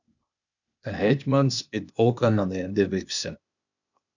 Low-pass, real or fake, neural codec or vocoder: 7.2 kHz; fake; codec, 16 kHz, 0.8 kbps, ZipCodec